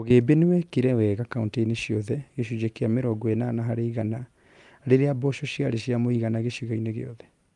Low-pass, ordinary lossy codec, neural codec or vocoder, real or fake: 10.8 kHz; Opus, 32 kbps; none; real